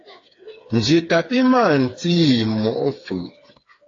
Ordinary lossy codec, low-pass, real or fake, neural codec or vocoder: AAC, 32 kbps; 7.2 kHz; fake; codec, 16 kHz, 4 kbps, FreqCodec, smaller model